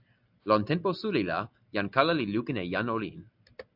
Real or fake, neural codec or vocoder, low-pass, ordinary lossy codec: real; none; 5.4 kHz; AAC, 48 kbps